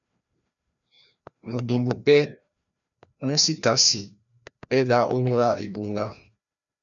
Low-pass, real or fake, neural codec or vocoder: 7.2 kHz; fake; codec, 16 kHz, 1 kbps, FreqCodec, larger model